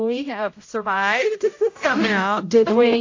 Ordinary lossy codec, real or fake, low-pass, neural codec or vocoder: AAC, 48 kbps; fake; 7.2 kHz; codec, 16 kHz, 0.5 kbps, X-Codec, HuBERT features, trained on general audio